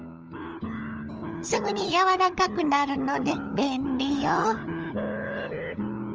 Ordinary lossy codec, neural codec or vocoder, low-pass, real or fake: Opus, 24 kbps; codec, 16 kHz, 16 kbps, FunCodec, trained on LibriTTS, 50 frames a second; 7.2 kHz; fake